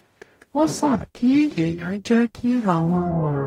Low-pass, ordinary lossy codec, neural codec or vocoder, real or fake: 19.8 kHz; AAC, 48 kbps; codec, 44.1 kHz, 0.9 kbps, DAC; fake